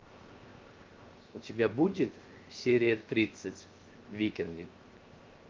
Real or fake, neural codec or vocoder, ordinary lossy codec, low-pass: fake; codec, 16 kHz, 0.7 kbps, FocalCodec; Opus, 32 kbps; 7.2 kHz